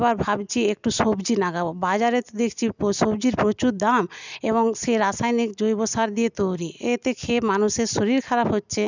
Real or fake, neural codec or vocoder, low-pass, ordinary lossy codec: real; none; 7.2 kHz; none